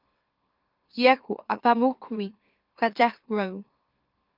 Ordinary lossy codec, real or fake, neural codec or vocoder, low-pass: Opus, 32 kbps; fake; autoencoder, 44.1 kHz, a latent of 192 numbers a frame, MeloTTS; 5.4 kHz